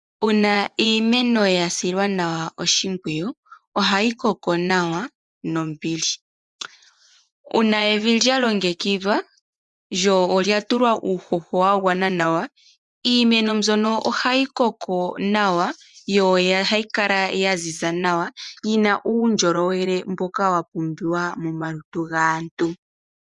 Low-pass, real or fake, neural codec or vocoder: 10.8 kHz; fake; vocoder, 24 kHz, 100 mel bands, Vocos